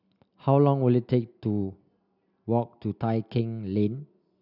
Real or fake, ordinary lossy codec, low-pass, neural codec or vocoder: real; none; 5.4 kHz; none